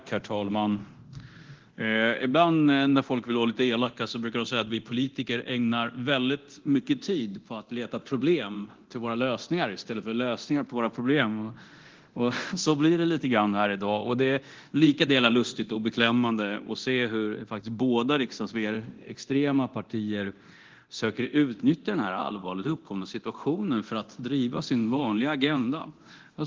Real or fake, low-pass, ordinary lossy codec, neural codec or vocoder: fake; 7.2 kHz; Opus, 16 kbps; codec, 24 kHz, 0.9 kbps, DualCodec